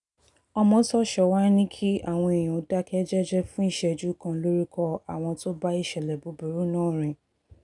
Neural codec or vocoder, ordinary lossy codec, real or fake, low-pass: none; none; real; 10.8 kHz